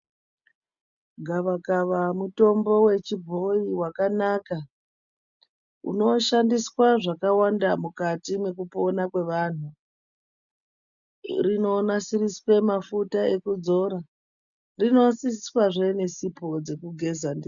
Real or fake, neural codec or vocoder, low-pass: real; none; 7.2 kHz